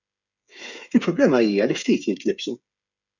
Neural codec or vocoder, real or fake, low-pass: codec, 16 kHz, 8 kbps, FreqCodec, smaller model; fake; 7.2 kHz